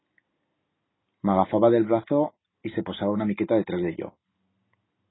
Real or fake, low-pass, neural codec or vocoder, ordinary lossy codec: real; 7.2 kHz; none; AAC, 16 kbps